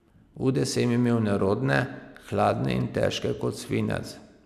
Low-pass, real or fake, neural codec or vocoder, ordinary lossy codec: 14.4 kHz; real; none; none